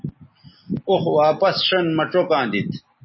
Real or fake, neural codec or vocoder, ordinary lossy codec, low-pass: real; none; MP3, 24 kbps; 7.2 kHz